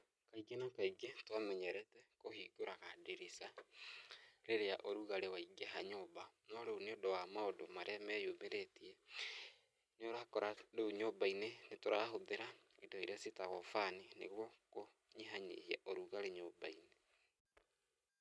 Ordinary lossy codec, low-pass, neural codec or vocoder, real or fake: none; none; none; real